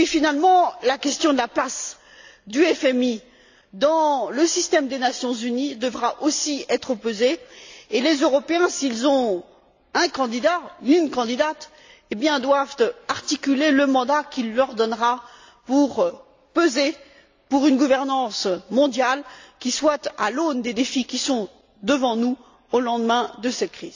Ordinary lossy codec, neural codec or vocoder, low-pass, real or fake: AAC, 48 kbps; none; 7.2 kHz; real